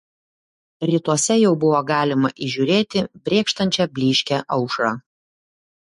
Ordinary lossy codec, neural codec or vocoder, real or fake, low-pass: MP3, 48 kbps; autoencoder, 48 kHz, 128 numbers a frame, DAC-VAE, trained on Japanese speech; fake; 14.4 kHz